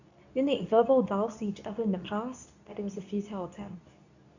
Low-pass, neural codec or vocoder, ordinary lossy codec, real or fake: 7.2 kHz; codec, 24 kHz, 0.9 kbps, WavTokenizer, medium speech release version 2; none; fake